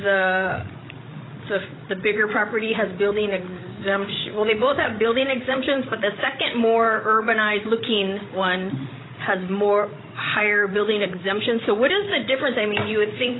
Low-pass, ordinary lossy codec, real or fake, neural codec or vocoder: 7.2 kHz; AAC, 16 kbps; fake; codec, 16 kHz, 16 kbps, FreqCodec, larger model